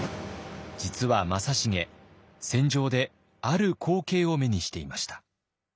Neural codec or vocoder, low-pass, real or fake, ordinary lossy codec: none; none; real; none